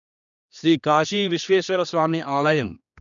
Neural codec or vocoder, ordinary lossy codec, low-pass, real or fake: codec, 16 kHz, 2 kbps, X-Codec, HuBERT features, trained on general audio; none; 7.2 kHz; fake